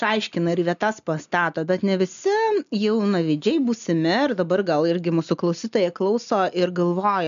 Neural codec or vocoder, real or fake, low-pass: none; real; 7.2 kHz